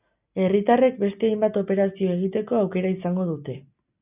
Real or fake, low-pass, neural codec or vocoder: real; 3.6 kHz; none